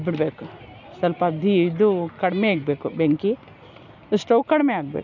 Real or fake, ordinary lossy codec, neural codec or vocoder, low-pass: real; none; none; 7.2 kHz